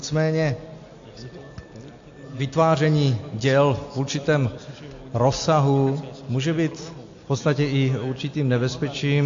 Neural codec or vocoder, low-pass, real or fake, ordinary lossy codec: none; 7.2 kHz; real; AAC, 48 kbps